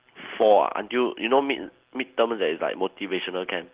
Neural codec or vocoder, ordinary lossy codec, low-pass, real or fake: none; Opus, 16 kbps; 3.6 kHz; real